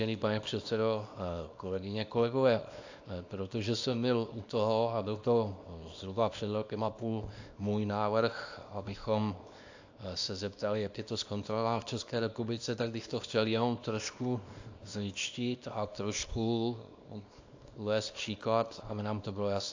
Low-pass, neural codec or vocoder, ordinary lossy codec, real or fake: 7.2 kHz; codec, 24 kHz, 0.9 kbps, WavTokenizer, small release; AAC, 48 kbps; fake